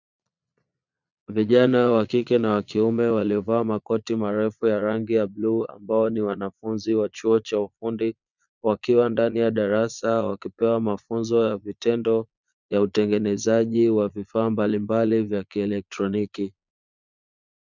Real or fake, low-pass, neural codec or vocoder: fake; 7.2 kHz; vocoder, 22.05 kHz, 80 mel bands, Vocos